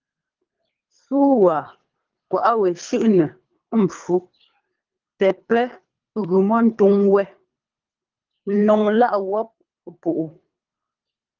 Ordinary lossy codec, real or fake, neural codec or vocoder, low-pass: Opus, 32 kbps; fake; codec, 24 kHz, 3 kbps, HILCodec; 7.2 kHz